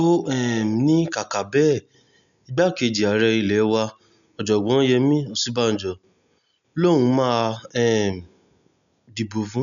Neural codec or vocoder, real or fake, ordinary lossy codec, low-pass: none; real; none; 7.2 kHz